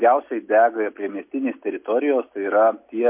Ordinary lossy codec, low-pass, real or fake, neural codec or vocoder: MP3, 32 kbps; 3.6 kHz; real; none